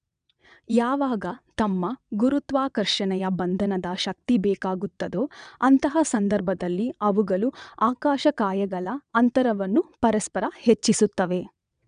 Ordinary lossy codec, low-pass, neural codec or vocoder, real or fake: none; 9.9 kHz; vocoder, 22.05 kHz, 80 mel bands, WaveNeXt; fake